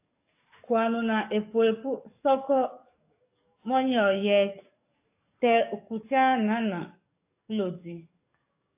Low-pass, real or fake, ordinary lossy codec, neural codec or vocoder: 3.6 kHz; fake; AAC, 32 kbps; codec, 44.1 kHz, 7.8 kbps, DAC